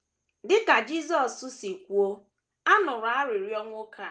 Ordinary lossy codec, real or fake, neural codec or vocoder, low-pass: Opus, 24 kbps; real; none; 9.9 kHz